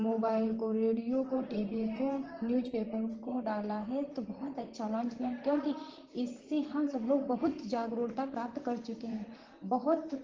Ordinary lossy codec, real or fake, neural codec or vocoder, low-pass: Opus, 16 kbps; fake; vocoder, 44.1 kHz, 128 mel bands, Pupu-Vocoder; 7.2 kHz